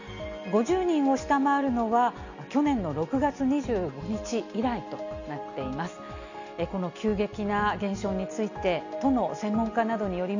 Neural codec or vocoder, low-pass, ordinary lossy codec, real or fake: none; 7.2 kHz; none; real